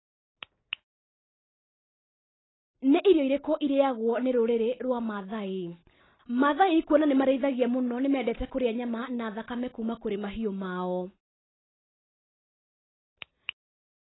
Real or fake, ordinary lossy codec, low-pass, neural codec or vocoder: real; AAC, 16 kbps; 7.2 kHz; none